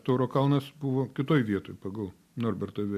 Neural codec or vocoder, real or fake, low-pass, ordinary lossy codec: none; real; 14.4 kHz; MP3, 96 kbps